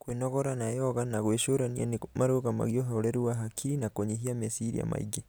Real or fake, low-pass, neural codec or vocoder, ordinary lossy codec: real; none; none; none